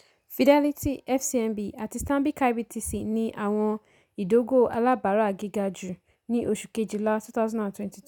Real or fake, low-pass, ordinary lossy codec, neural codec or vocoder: real; 19.8 kHz; none; none